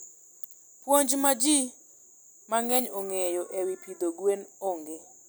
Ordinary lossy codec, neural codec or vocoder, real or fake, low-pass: none; none; real; none